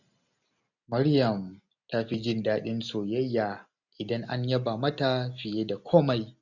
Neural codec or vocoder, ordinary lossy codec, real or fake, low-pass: none; none; real; 7.2 kHz